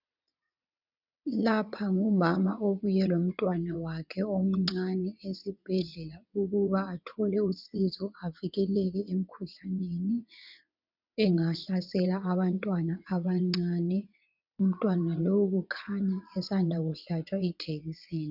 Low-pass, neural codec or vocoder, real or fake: 5.4 kHz; vocoder, 22.05 kHz, 80 mel bands, WaveNeXt; fake